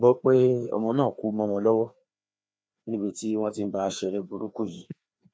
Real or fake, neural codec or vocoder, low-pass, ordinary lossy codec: fake; codec, 16 kHz, 2 kbps, FreqCodec, larger model; none; none